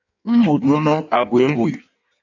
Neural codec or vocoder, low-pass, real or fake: codec, 16 kHz in and 24 kHz out, 1.1 kbps, FireRedTTS-2 codec; 7.2 kHz; fake